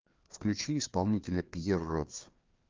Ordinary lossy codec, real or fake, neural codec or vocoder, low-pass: Opus, 32 kbps; fake; codec, 16 kHz, 2 kbps, FreqCodec, larger model; 7.2 kHz